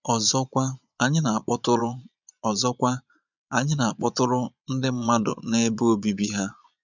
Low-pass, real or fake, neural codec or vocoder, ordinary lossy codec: 7.2 kHz; fake; vocoder, 22.05 kHz, 80 mel bands, Vocos; none